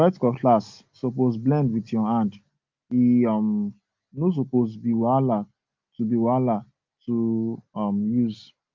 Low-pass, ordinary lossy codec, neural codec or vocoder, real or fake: 7.2 kHz; Opus, 24 kbps; none; real